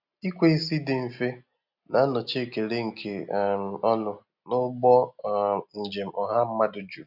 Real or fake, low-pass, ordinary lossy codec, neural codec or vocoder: real; 5.4 kHz; none; none